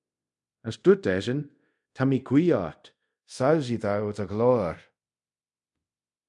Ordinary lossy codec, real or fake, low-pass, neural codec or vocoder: MP3, 64 kbps; fake; 10.8 kHz; codec, 24 kHz, 0.5 kbps, DualCodec